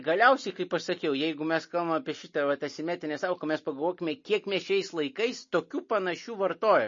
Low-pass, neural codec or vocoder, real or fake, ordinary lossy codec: 7.2 kHz; none; real; MP3, 32 kbps